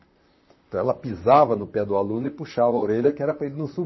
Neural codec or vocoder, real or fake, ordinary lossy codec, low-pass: vocoder, 44.1 kHz, 128 mel bands, Pupu-Vocoder; fake; MP3, 24 kbps; 7.2 kHz